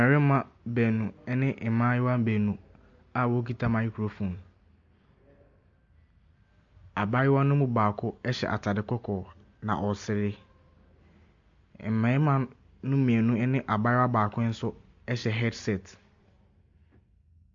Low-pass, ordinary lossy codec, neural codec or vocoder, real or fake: 7.2 kHz; MP3, 48 kbps; none; real